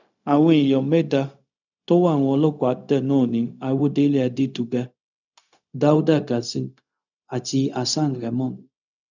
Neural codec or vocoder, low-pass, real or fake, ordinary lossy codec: codec, 16 kHz, 0.4 kbps, LongCat-Audio-Codec; 7.2 kHz; fake; none